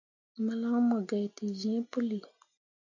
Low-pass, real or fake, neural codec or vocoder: 7.2 kHz; real; none